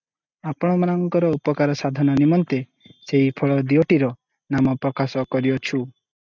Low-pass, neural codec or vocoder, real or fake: 7.2 kHz; none; real